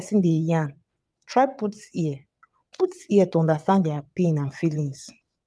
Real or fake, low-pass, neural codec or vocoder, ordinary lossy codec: fake; none; vocoder, 22.05 kHz, 80 mel bands, WaveNeXt; none